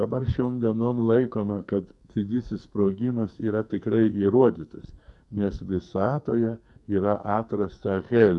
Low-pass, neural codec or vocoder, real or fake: 10.8 kHz; codec, 44.1 kHz, 2.6 kbps, SNAC; fake